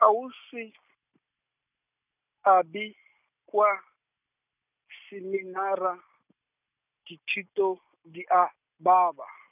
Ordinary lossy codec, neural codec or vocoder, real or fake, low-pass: none; none; real; 3.6 kHz